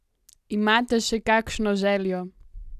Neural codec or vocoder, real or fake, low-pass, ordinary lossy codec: none; real; 14.4 kHz; none